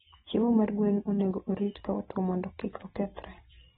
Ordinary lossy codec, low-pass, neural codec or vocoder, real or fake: AAC, 16 kbps; 10.8 kHz; none; real